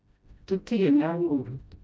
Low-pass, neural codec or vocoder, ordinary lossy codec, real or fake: none; codec, 16 kHz, 0.5 kbps, FreqCodec, smaller model; none; fake